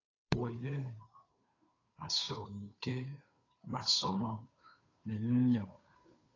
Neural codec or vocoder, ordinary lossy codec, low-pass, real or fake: codec, 16 kHz, 2 kbps, FunCodec, trained on Chinese and English, 25 frames a second; AAC, 32 kbps; 7.2 kHz; fake